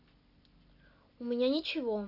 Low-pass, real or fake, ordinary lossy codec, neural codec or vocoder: 5.4 kHz; real; none; none